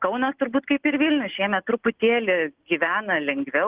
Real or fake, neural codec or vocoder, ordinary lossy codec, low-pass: real; none; Opus, 16 kbps; 3.6 kHz